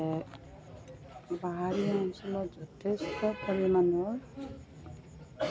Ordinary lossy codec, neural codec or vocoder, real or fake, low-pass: none; none; real; none